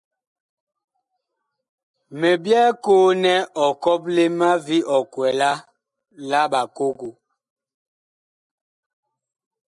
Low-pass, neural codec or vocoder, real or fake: 10.8 kHz; none; real